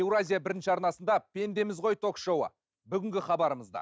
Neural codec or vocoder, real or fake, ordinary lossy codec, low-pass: none; real; none; none